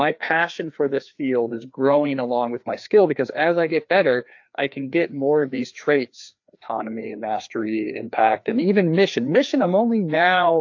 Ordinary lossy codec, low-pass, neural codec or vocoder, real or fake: AAC, 48 kbps; 7.2 kHz; codec, 16 kHz, 2 kbps, FreqCodec, larger model; fake